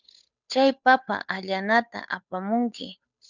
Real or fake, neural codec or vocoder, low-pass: fake; codec, 16 kHz, 8 kbps, FunCodec, trained on Chinese and English, 25 frames a second; 7.2 kHz